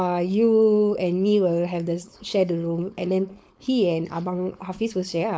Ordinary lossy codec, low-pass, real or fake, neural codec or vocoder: none; none; fake; codec, 16 kHz, 4.8 kbps, FACodec